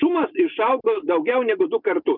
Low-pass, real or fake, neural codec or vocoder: 5.4 kHz; real; none